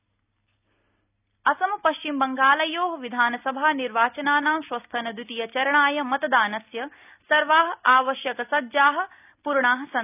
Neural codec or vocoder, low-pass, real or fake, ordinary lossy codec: none; 3.6 kHz; real; none